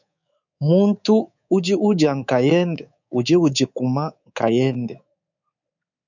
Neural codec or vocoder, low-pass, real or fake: codec, 24 kHz, 3.1 kbps, DualCodec; 7.2 kHz; fake